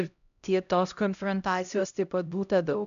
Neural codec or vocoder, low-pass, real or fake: codec, 16 kHz, 0.5 kbps, X-Codec, HuBERT features, trained on LibriSpeech; 7.2 kHz; fake